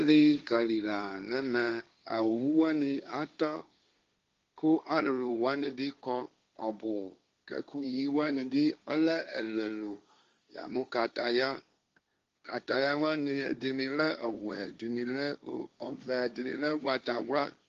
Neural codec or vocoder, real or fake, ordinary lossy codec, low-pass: codec, 16 kHz, 1.1 kbps, Voila-Tokenizer; fake; Opus, 32 kbps; 7.2 kHz